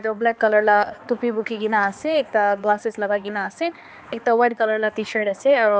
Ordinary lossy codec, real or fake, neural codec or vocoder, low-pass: none; fake; codec, 16 kHz, 2 kbps, X-Codec, HuBERT features, trained on balanced general audio; none